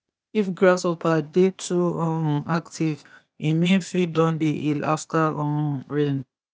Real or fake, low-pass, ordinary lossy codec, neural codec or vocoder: fake; none; none; codec, 16 kHz, 0.8 kbps, ZipCodec